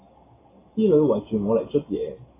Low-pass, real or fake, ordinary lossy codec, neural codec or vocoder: 3.6 kHz; real; AAC, 16 kbps; none